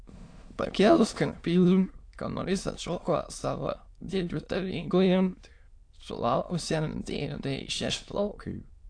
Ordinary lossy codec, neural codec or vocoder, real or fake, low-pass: AAC, 48 kbps; autoencoder, 22.05 kHz, a latent of 192 numbers a frame, VITS, trained on many speakers; fake; 9.9 kHz